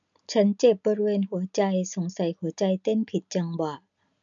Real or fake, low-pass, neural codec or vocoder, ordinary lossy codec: real; 7.2 kHz; none; none